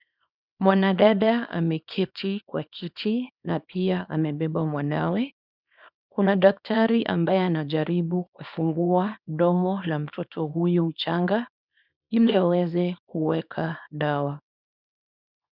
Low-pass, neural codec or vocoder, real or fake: 5.4 kHz; codec, 24 kHz, 0.9 kbps, WavTokenizer, small release; fake